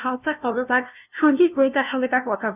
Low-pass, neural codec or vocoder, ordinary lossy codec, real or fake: 3.6 kHz; codec, 16 kHz, 0.5 kbps, FunCodec, trained on LibriTTS, 25 frames a second; none; fake